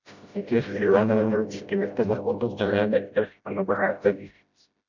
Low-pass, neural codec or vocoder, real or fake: 7.2 kHz; codec, 16 kHz, 0.5 kbps, FreqCodec, smaller model; fake